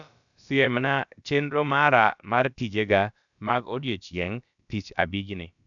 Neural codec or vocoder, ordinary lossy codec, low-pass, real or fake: codec, 16 kHz, about 1 kbps, DyCAST, with the encoder's durations; none; 7.2 kHz; fake